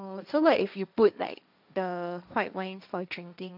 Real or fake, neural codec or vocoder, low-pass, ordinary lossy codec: fake; codec, 16 kHz, 1.1 kbps, Voila-Tokenizer; 5.4 kHz; none